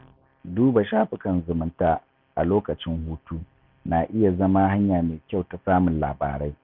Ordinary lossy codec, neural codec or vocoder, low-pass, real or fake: none; none; 5.4 kHz; real